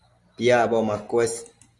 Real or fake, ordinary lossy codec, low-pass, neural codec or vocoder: real; Opus, 32 kbps; 10.8 kHz; none